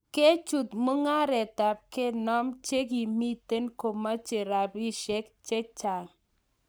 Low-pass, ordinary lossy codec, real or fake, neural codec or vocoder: none; none; fake; codec, 44.1 kHz, 7.8 kbps, Pupu-Codec